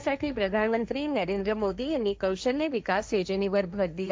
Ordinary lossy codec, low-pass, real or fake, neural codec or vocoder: none; none; fake; codec, 16 kHz, 1.1 kbps, Voila-Tokenizer